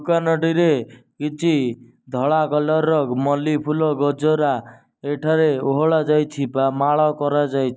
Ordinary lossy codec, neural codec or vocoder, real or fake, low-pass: none; none; real; none